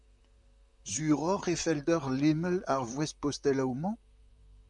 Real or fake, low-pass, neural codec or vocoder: fake; 10.8 kHz; vocoder, 44.1 kHz, 128 mel bands, Pupu-Vocoder